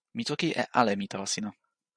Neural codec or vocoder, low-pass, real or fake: none; 9.9 kHz; real